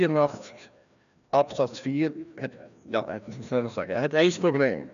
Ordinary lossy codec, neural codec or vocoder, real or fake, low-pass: none; codec, 16 kHz, 1 kbps, FreqCodec, larger model; fake; 7.2 kHz